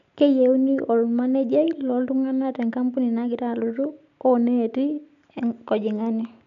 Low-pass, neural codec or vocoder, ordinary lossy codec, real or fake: 7.2 kHz; none; none; real